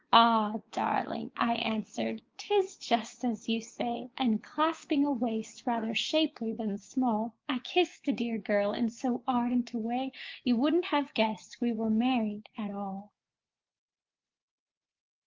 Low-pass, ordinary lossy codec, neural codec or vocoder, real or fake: 7.2 kHz; Opus, 32 kbps; codec, 16 kHz, 6 kbps, DAC; fake